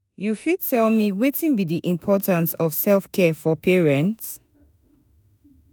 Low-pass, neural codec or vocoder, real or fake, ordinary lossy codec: none; autoencoder, 48 kHz, 32 numbers a frame, DAC-VAE, trained on Japanese speech; fake; none